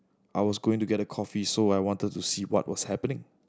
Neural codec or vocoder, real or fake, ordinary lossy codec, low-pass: none; real; none; none